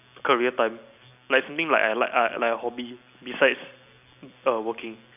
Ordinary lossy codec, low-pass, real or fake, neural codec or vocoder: none; 3.6 kHz; real; none